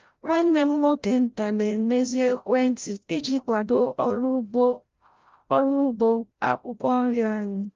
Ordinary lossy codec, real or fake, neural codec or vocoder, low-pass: Opus, 32 kbps; fake; codec, 16 kHz, 0.5 kbps, FreqCodec, larger model; 7.2 kHz